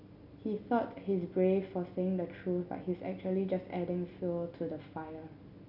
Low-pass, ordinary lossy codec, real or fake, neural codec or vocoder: 5.4 kHz; none; real; none